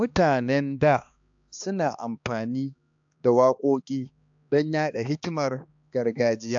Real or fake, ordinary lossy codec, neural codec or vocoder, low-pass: fake; AAC, 64 kbps; codec, 16 kHz, 2 kbps, X-Codec, HuBERT features, trained on balanced general audio; 7.2 kHz